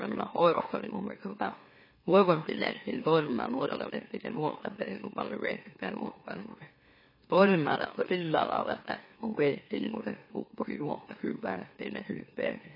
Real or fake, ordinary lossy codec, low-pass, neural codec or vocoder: fake; MP3, 24 kbps; 5.4 kHz; autoencoder, 44.1 kHz, a latent of 192 numbers a frame, MeloTTS